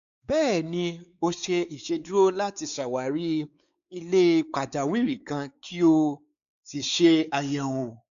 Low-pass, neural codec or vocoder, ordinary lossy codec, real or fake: 7.2 kHz; codec, 16 kHz, 4 kbps, X-Codec, WavLM features, trained on Multilingual LibriSpeech; none; fake